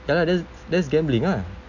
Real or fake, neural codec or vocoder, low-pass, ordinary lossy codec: real; none; 7.2 kHz; none